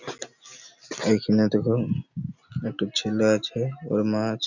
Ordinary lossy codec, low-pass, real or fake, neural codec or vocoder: none; 7.2 kHz; real; none